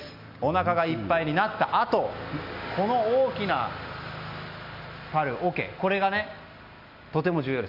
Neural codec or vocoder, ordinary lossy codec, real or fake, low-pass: none; none; real; 5.4 kHz